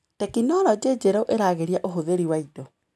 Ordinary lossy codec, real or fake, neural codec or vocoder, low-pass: none; real; none; none